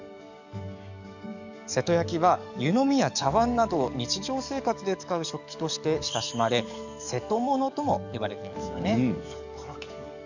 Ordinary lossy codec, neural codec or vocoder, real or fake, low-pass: none; codec, 44.1 kHz, 7.8 kbps, DAC; fake; 7.2 kHz